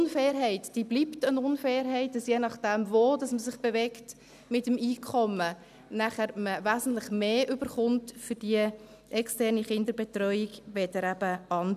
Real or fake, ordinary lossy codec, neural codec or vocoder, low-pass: real; none; none; 14.4 kHz